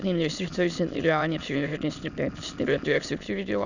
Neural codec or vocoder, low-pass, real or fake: autoencoder, 22.05 kHz, a latent of 192 numbers a frame, VITS, trained on many speakers; 7.2 kHz; fake